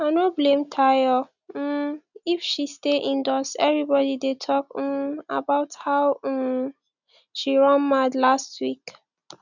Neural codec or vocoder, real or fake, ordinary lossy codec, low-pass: none; real; none; 7.2 kHz